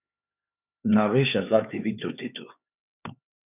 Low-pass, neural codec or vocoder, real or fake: 3.6 kHz; codec, 16 kHz, 4 kbps, X-Codec, HuBERT features, trained on LibriSpeech; fake